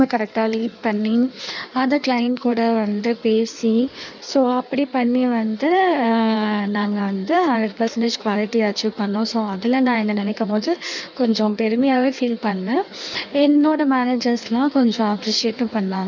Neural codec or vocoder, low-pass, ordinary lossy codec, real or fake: codec, 16 kHz in and 24 kHz out, 1.1 kbps, FireRedTTS-2 codec; 7.2 kHz; none; fake